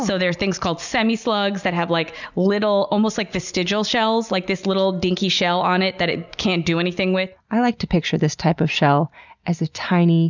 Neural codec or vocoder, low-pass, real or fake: none; 7.2 kHz; real